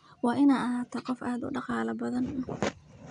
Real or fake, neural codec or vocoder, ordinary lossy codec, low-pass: real; none; none; 9.9 kHz